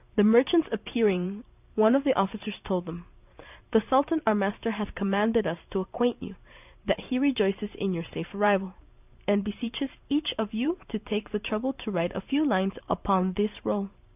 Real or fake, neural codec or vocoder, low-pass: fake; vocoder, 44.1 kHz, 128 mel bands every 512 samples, BigVGAN v2; 3.6 kHz